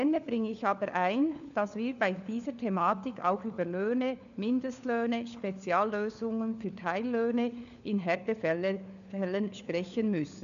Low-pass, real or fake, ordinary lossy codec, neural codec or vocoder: 7.2 kHz; fake; none; codec, 16 kHz, 4 kbps, FunCodec, trained on LibriTTS, 50 frames a second